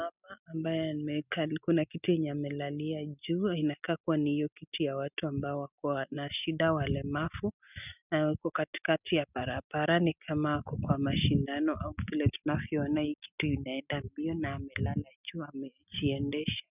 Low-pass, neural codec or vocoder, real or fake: 3.6 kHz; none; real